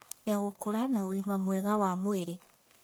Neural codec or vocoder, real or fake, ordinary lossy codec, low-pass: codec, 44.1 kHz, 1.7 kbps, Pupu-Codec; fake; none; none